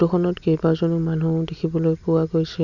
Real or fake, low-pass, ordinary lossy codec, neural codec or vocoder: real; 7.2 kHz; none; none